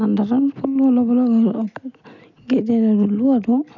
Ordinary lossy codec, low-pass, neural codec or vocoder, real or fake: none; 7.2 kHz; none; real